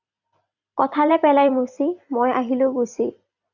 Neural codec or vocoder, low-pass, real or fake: vocoder, 44.1 kHz, 128 mel bands every 256 samples, BigVGAN v2; 7.2 kHz; fake